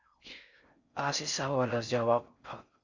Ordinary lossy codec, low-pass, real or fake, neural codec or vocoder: Opus, 64 kbps; 7.2 kHz; fake; codec, 16 kHz in and 24 kHz out, 0.6 kbps, FocalCodec, streaming, 4096 codes